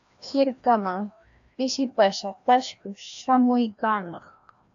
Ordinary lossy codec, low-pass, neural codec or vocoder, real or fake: AAC, 64 kbps; 7.2 kHz; codec, 16 kHz, 1 kbps, FreqCodec, larger model; fake